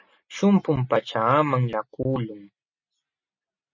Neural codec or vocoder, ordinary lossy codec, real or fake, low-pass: none; MP3, 32 kbps; real; 7.2 kHz